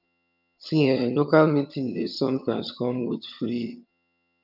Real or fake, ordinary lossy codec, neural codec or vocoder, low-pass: fake; none; vocoder, 22.05 kHz, 80 mel bands, HiFi-GAN; 5.4 kHz